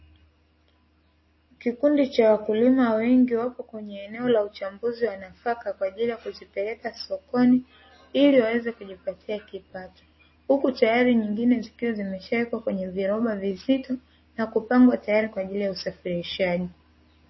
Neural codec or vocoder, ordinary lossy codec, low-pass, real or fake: none; MP3, 24 kbps; 7.2 kHz; real